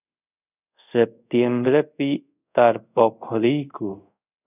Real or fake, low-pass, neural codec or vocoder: fake; 3.6 kHz; codec, 24 kHz, 0.5 kbps, DualCodec